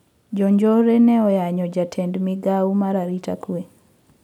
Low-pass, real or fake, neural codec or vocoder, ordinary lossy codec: 19.8 kHz; real; none; none